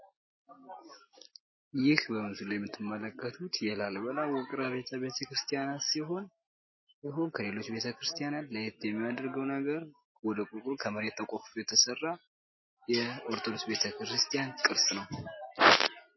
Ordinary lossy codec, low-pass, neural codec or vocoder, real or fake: MP3, 24 kbps; 7.2 kHz; none; real